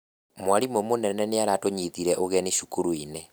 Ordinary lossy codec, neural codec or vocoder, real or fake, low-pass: none; none; real; none